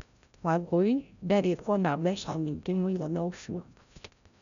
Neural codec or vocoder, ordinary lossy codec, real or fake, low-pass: codec, 16 kHz, 0.5 kbps, FreqCodec, larger model; none; fake; 7.2 kHz